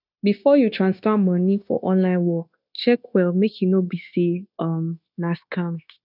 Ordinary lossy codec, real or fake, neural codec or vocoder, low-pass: none; fake; codec, 16 kHz, 0.9 kbps, LongCat-Audio-Codec; 5.4 kHz